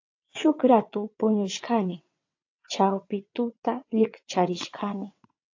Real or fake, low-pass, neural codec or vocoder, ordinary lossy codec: fake; 7.2 kHz; vocoder, 22.05 kHz, 80 mel bands, WaveNeXt; AAC, 48 kbps